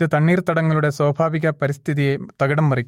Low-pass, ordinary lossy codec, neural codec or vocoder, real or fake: 19.8 kHz; MP3, 64 kbps; autoencoder, 48 kHz, 128 numbers a frame, DAC-VAE, trained on Japanese speech; fake